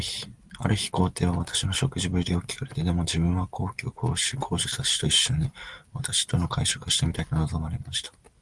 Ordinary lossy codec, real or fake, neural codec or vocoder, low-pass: Opus, 24 kbps; real; none; 10.8 kHz